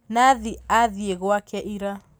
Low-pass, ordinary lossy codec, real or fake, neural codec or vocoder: none; none; real; none